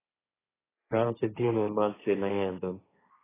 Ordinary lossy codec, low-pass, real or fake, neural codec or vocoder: AAC, 16 kbps; 3.6 kHz; fake; codec, 16 kHz, 1.1 kbps, Voila-Tokenizer